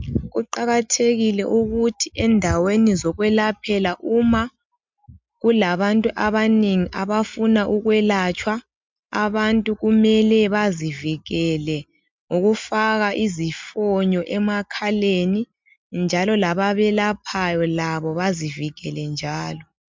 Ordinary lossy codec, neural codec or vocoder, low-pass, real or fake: MP3, 64 kbps; none; 7.2 kHz; real